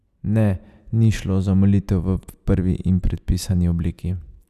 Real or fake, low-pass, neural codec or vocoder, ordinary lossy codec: real; 14.4 kHz; none; none